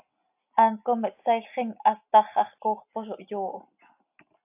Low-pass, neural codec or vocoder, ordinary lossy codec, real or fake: 3.6 kHz; none; AAC, 32 kbps; real